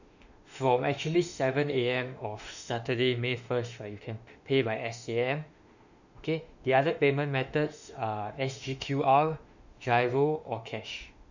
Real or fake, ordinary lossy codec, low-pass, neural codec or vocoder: fake; none; 7.2 kHz; autoencoder, 48 kHz, 32 numbers a frame, DAC-VAE, trained on Japanese speech